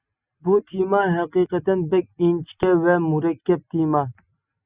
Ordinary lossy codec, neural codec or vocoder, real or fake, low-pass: Opus, 64 kbps; none; real; 3.6 kHz